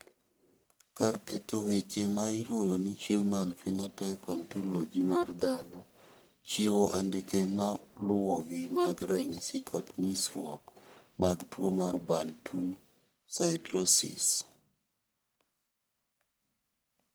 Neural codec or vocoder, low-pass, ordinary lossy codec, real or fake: codec, 44.1 kHz, 1.7 kbps, Pupu-Codec; none; none; fake